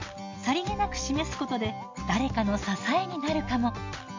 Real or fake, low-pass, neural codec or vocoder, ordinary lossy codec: real; 7.2 kHz; none; MP3, 48 kbps